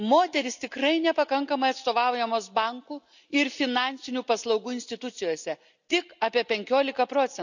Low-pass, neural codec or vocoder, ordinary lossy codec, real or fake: 7.2 kHz; none; none; real